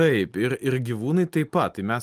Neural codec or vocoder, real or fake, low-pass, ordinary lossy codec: none; real; 14.4 kHz; Opus, 32 kbps